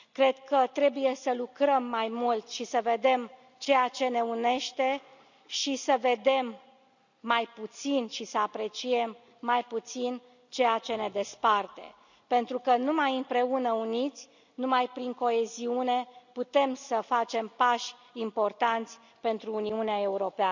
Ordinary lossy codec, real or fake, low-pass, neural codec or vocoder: none; real; 7.2 kHz; none